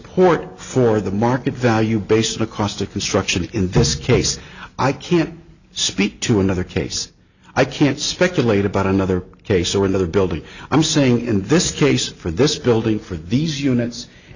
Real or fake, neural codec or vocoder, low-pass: real; none; 7.2 kHz